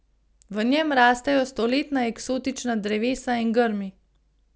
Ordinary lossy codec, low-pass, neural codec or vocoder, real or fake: none; none; none; real